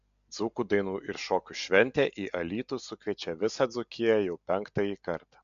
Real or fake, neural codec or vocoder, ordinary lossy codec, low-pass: real; none; MP3, 64 kbps; 7.2 kHz